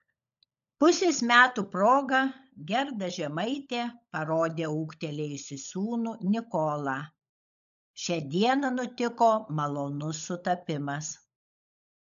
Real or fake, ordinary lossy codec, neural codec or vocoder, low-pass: fake; AAC, 96 kbps; codec, 16 kHz, 16 kbps, FunCodec, trained on LibriTTS, 50 frames a second; 7.2 kHz